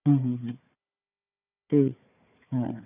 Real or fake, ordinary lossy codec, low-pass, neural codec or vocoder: fake; none; 3.6 kHz; codec, 16 kHz, 4 kbps, FunCodec, trained on Chinese and English, 50 frames a second